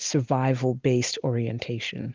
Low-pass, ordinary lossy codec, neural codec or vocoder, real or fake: 7.2 kHz; Opus, 24 kbps; none; real